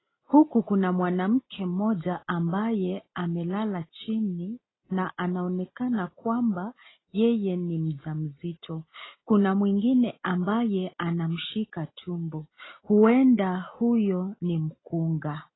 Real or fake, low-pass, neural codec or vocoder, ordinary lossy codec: real; 7.2 kHz; none; AAC, 16 kbps